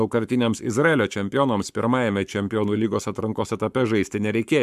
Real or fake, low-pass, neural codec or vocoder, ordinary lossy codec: fake; 14.4 kHz; codec, 44.1 kHz, 7.8 kbps, Pupu-Codec; MP3, 96 kbps